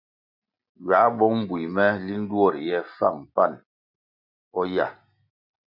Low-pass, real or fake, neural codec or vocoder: 5.4 kHz; real; none